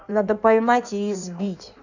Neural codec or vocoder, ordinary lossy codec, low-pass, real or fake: codec, 16 kHz in and 24 kHz out, 1.1 kbps, FireRedTTS-2 codec; none; 7.2 kHz; fake